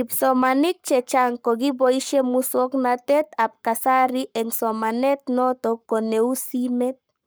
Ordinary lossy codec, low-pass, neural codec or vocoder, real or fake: none; none; codec, 44.1 kHz, 7.8 kbps, Pupu-Codec; fake